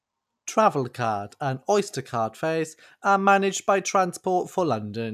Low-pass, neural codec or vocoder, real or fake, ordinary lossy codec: 14.4 kHz; none; real; none